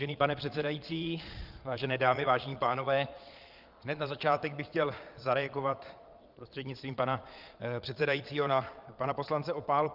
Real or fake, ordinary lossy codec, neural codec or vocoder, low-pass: fake; Opus, 24 kbps; vocoder, 22.05 kHz, 80 mel bands, Vocos; 5.4 kHz